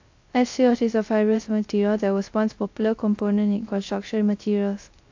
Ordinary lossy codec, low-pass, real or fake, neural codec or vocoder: AAC, 48 kbps; 7.2 kHz; fake; codec, 16 kHz, 0.3 kbps, FocalCodec